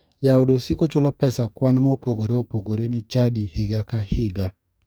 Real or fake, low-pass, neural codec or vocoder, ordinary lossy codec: fake; none; codec, 44.1 kHz, 2.6 kbps, DAC; none